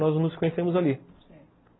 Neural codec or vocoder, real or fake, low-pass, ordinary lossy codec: none; real; 7.2 kHz; AAC, 16 kbps